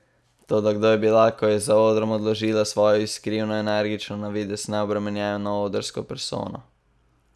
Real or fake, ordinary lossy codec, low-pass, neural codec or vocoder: real; none; none; none